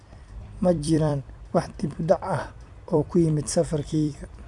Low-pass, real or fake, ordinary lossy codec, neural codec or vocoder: 10.8 kHz; fake; none; vocoder, 44.1 kHz, 128 mel bands every 256 samples, BigVGAN v2